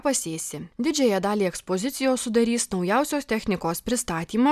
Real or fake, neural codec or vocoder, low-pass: real; none; 14.4 kHz